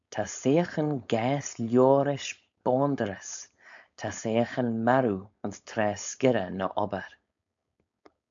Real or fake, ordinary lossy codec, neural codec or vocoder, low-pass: fake; AAC, 64 kbps; codec, 16 kHz, 4.8 kbps, FACodec; 7.2 kHz